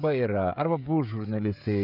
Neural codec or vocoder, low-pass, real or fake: codec, 16 kHz, 16 kbps, FreqCodec, smaller model; 5.4 kHz; fake